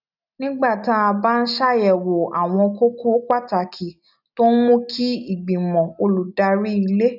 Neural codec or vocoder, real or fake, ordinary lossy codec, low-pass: none; real; none; 5.4 kHz